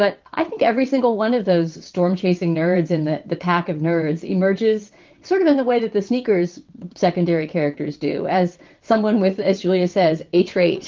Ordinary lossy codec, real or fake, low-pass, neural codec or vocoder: Opus, 32 kbps; fake; 7.2 kHz; vocoder, 22.05 kHz, 80 mel bands, WaveNeXt